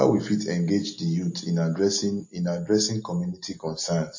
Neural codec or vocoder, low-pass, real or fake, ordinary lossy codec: none; 7.2 kHz; real; MP3, 32 kbps